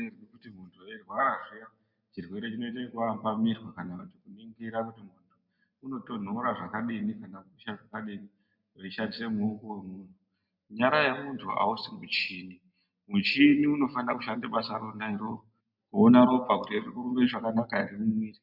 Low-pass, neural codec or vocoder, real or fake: 5.4 kHz; none; real